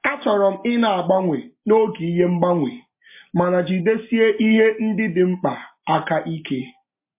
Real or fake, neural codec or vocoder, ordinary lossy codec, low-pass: real; none; MP3, 24 kbps; 3.6 kHz